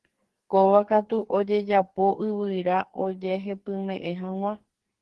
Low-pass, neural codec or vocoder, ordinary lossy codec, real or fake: 10.8 kHz; codec, 44.1 kHz, 2.6 kbps, SNAC; Opus, 16 kbps; fake